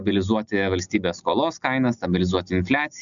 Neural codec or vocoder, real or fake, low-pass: none; real; 7.2 kHz